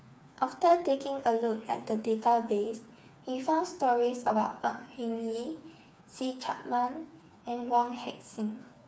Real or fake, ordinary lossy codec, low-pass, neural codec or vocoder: fake; none; none; codec, 16 kHz, 4 kbps, FreqCodec, smaller model